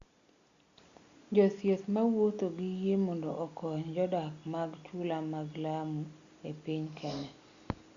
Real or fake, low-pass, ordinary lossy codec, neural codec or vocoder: real; 7.2 kHz; Opus, 64 kbps; none